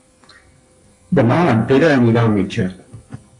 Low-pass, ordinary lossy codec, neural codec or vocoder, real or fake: 10.8 kHz; AAC, 64 kbps; codec, 44.1 kHz, 2.6 kbps, SNAC; fake